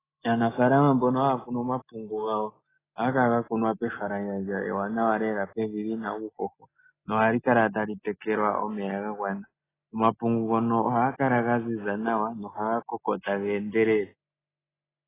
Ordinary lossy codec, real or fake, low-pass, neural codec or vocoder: AAC, 16 kbps; real; 3.6 kHz; none